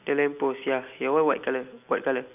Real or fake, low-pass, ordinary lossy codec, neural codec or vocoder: real; 3.6 kHz; none; none